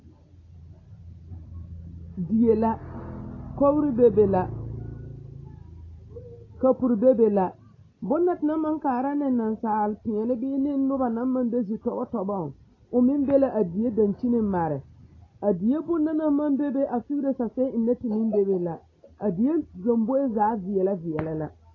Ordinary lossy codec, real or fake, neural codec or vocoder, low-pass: AAC, 32 kbps; real; none; 7.2 kHz